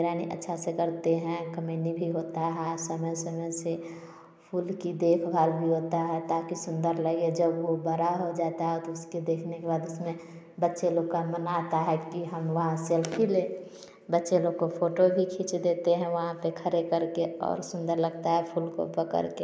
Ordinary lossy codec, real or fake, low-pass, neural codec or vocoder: none; real; none; none